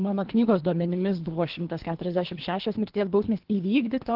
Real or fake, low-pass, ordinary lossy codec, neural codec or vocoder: fake; 5.4 kHz; Opus, 16 kbps; codec, 24 kHz, 3 kbps, HILCodec